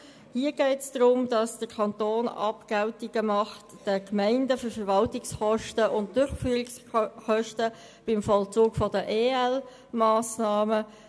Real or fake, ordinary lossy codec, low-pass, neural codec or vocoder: real; none; none; none